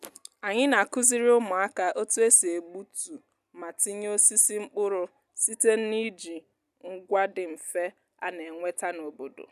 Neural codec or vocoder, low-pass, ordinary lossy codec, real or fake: none; 14.4 kHz; none; real